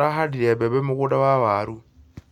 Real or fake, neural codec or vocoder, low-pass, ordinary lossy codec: real; none; 19.8 kHz; none